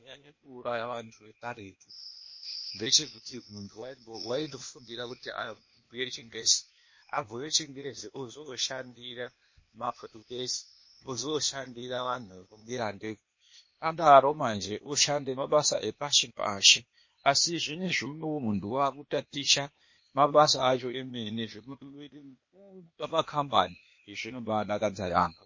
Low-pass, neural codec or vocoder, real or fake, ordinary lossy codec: 7.2 kHz; codec, 16 kHz, 0.8 kbps, ZipCodec; fake; MP3, 32 kbps